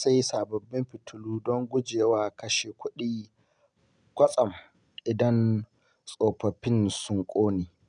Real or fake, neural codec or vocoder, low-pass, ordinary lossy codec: real; none; 9.9 kHz; none